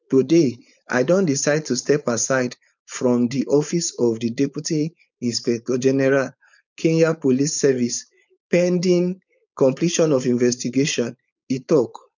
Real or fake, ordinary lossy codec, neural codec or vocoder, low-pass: fake; none; codec, 16 kHz, 4.8 kbps, FACodec; 7.2 kHz